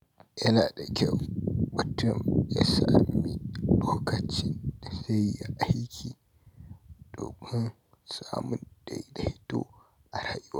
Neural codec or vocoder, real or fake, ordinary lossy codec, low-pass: none; real; none; 19.8 kHz